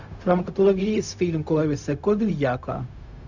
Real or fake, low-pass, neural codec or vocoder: fake; 7.2 kHz; codec, 16 kHz, 0.4 kbps, LongCat-Audio-Codec